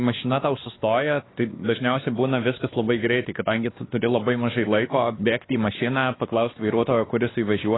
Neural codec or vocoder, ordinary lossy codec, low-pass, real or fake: codec, 16 kHz, 2 kbps, X-Codec, WavLM features, trained on Multilingual LibriSpeech; AAC, 16 kbps; 7.2 kHz; fake